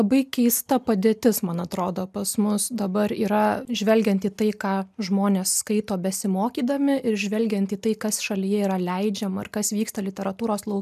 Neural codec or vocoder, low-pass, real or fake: none; 14.4 kHz; real